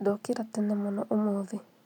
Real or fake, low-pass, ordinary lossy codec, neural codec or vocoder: real; 19.8 kHz; none; none